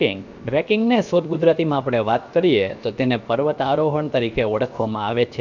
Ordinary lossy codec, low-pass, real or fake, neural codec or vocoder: none; 7.2 kHz; fake; codec, 16 kHz, 0.7 kbps, FocalCodec